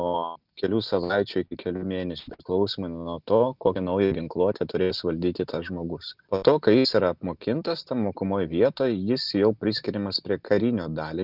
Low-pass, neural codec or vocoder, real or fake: 5.4 kHz; none; real